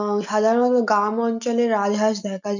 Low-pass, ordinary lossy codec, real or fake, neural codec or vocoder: 7.2 kHz; none; real; none